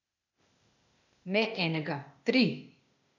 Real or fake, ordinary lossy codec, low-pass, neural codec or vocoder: fake; none; 7.2 kHz; codec, 16 kHz, 0.8 kbps, ZipCodec